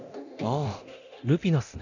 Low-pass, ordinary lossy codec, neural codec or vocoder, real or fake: 7.2 kHz; none; codec, 24 kHz, 0.9 kbps, DualCodec; fake